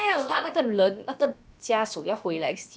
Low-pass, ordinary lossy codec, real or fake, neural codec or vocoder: none; none; fake; codec, 16 kHz, about 1 kbps, DyCAST, with the encoder's durations